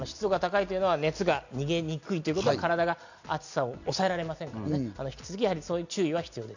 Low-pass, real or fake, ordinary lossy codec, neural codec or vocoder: 7.2 kHz; real; none; none